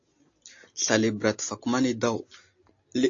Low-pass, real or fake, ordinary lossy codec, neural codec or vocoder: 7.2 kHz; real; AAC, 48 kbps; none